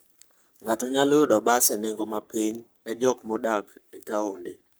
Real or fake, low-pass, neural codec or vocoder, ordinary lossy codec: fake; none; codec, 44.1 kHz, 3.4 kbps, Pupu-Codec; none